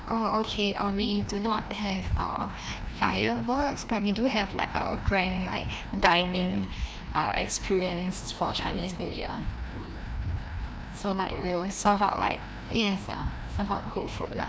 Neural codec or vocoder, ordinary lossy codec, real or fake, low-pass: codec, 16 kHz, 1 kbps, FreqCodec, larger model; none; fake; none